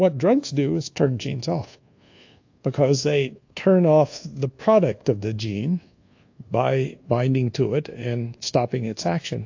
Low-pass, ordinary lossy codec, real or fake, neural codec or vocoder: 7.2 kHz; AAC, 48 kbps; fake; codec, 24 kHz, 1.2 kbps, DualCodec